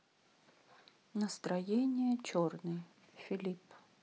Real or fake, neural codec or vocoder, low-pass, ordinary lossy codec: real; none; none; none